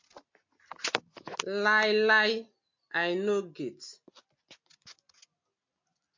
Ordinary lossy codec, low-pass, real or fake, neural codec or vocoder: MP3, 48 kbps; 7.2 kHz; real; none